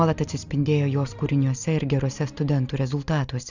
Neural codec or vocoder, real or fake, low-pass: none; real; 7.2 kHz